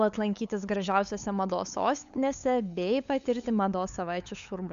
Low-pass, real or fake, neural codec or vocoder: 7.2 kHz; fake; codec, 16 kHz, 8 kbps, FunCodec, trained on LibriTTS, 25 frames a second